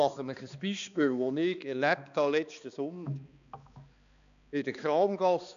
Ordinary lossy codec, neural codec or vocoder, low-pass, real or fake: none; codec, 16 kHz, 2 kbps, X-Codec, HuBERT features, trained on balanced general audio; 7.2 kHz; fake